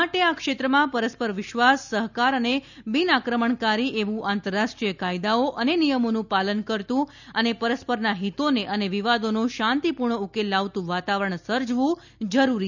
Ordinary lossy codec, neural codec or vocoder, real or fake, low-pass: none; none; real; 7.2 kHz